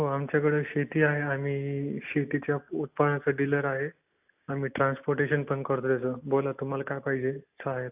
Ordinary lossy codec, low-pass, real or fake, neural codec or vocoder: AAC, 32 kbps; 3.6 kHz; real; none